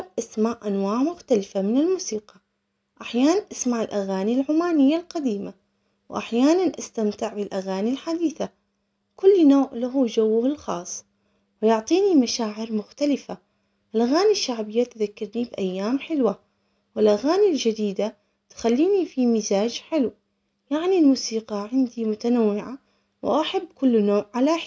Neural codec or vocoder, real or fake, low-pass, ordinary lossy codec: none; real; none; none